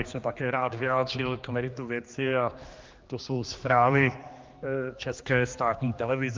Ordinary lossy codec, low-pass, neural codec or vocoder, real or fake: Opus, 24 kbps; 7.2 kHz; codec, 16 kHz, 2 kbps, X-Codec, HuBERT features, trained on general audio; fake